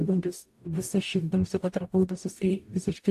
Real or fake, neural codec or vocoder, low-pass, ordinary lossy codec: fake; codec, 44.1 kHz, 0.9 kbps, DAC; 14.4 kHz; AAC, 64 kbps